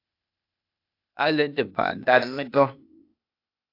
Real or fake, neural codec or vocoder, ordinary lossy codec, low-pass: fake; codec, 16 kHz, 0.8 kbps, ZipCodec; AAC, 32 kbps; 5.4 kHz